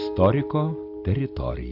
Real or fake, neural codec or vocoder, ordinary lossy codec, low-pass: real; none; AAC, 48 kbps; 5.4 kHz